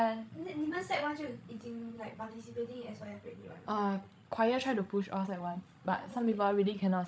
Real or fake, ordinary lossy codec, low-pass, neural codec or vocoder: fake; none; none; codec, 16 kHz, 16 kbps, FreqCodec, larger model